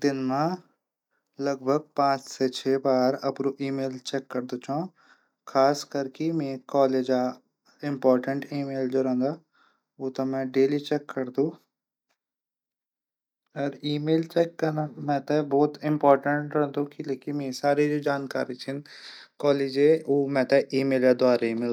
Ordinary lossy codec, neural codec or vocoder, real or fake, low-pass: none; none; real; 19.8 kHz